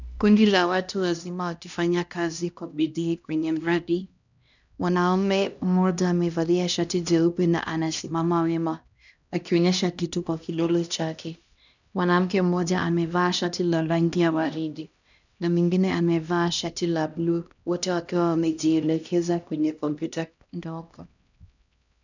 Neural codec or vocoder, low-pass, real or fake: codec, 16 kHz, 1 kbps, X-Codec, HuBERT features, trained on LibriSpeech; 7.2 kHz; fake